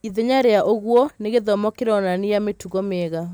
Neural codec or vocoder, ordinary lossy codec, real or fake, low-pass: none; none; real; none